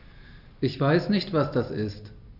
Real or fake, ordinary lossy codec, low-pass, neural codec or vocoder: real; none; 5.4 kHz; none